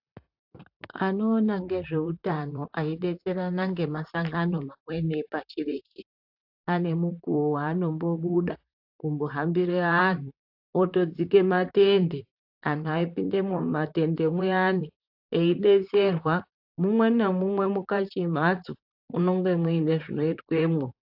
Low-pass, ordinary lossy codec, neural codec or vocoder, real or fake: 5.4 kHz; AAC, 48 kbps; vocoder, 44.1 kHz, 128 mel bands, Pupu-Vocoder; fake